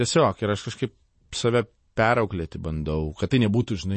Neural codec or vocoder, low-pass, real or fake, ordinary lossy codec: none; 10.8 kHz; real; MP3, 32 kbps